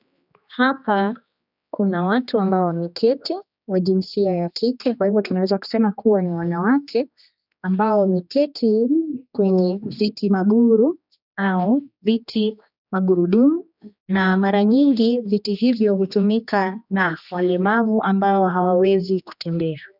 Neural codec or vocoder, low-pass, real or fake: codec, 16 kHz, 2 kbps, X-Codec, HuBERT features, trained on general audio; 5.4 kHz; fake